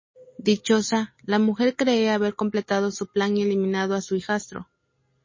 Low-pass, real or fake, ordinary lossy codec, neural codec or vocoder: 7.2 kHz; real; MP3, 32 kbps; none